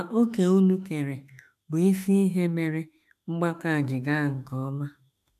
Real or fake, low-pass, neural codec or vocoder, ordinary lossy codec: fake; 14.4 kHz; autoencoder, 48 kHz, 32 numbers a frame, DAC-VAE, trained on Japanese speech; AAC, 96 kbps